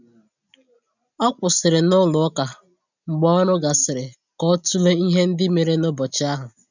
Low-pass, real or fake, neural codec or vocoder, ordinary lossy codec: 7.2 kHz; real; none; none